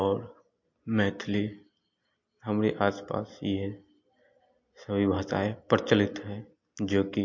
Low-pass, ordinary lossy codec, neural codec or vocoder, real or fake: 7.2 kHz; MP3, 48 kbps; none; real